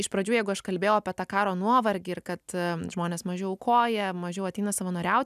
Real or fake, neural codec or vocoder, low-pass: real; none; 14.4 kHz